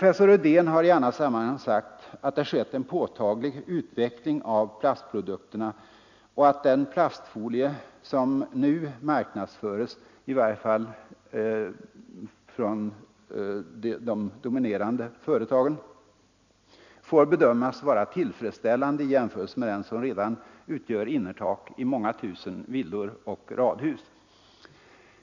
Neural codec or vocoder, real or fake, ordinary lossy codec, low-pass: none; real; none; 7.2 kHz